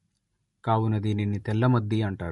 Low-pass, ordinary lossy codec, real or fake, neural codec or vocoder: 19.8 kHz; MP3, 48 kbps; real; none